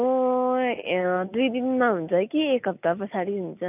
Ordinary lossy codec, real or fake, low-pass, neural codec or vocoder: none; real; 3.6 kHz; none